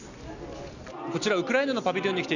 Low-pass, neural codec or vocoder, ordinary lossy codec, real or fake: 7.2 kHz; none; none; real